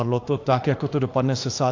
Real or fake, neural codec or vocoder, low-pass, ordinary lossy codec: fake; autoencoder, 48 kHz, 32 numbers a frame, DAC-VAE, trained on Japanese speech; 7.2 kHz; AAC, 48 kbps